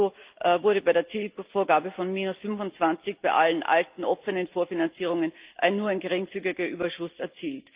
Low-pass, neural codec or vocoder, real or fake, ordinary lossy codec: 3.6 kHz; none; real; Opus, 32 kbps